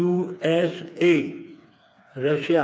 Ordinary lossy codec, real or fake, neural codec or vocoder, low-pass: none; fake; codec, 16 kHz, 4 kbps, FreqCodec, smaller model; none